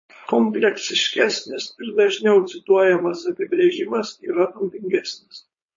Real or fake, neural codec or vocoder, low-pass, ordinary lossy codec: fake; codec, 16 kHz, 4.8 kbps, FACodec; 7.2 kHz; MP3, 32 kbps